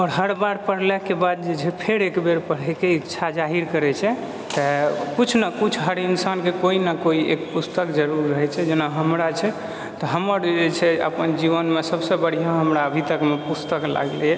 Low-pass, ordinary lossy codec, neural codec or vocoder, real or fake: none; none; none; real